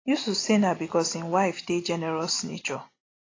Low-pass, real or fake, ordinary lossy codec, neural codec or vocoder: 7.2 kHz; real; AAC, 32 kbps; none